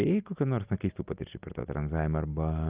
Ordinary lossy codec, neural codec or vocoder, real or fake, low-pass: Opus, 24 kbps; none; real; 3.6 kHz